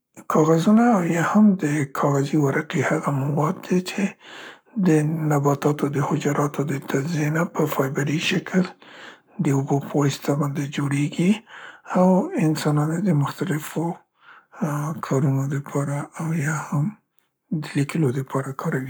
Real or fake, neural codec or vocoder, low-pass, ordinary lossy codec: fake; codec, 44.1 kHz, 7.8 kbps, Pupu-Codec; none; none